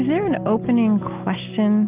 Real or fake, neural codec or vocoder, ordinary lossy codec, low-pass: real; none; Opus, 32 kbps; 3.6 kHz